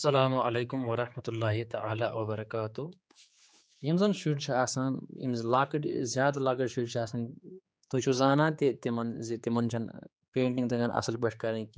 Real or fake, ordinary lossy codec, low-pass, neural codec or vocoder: fake; none; none; codec, 16 kHz, 4 kbps, X-Codec, HuBERT features, trained on general audio